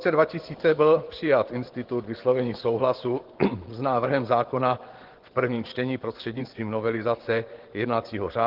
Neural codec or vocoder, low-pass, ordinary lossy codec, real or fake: vocoder, 24 kHz, 100 mel bands, Vocos; 5.4 kHz; Opus, 16 kbps; fake